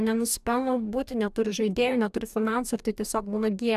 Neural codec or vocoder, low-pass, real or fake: codec, 44.1 kHz, 2.6 kbps, DAC; 14.4 kHz; fake